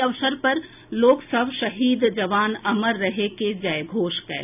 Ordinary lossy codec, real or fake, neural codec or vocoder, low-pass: none; real; none; 3.6 kHz